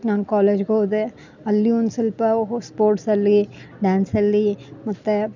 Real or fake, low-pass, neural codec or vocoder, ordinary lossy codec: real; 7.2 kHz; none; none